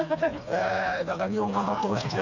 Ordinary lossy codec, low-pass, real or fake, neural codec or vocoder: none; 7.2 kHz; fake; codec, 16 kHz, 2 kbps, FreqCodec, smaller model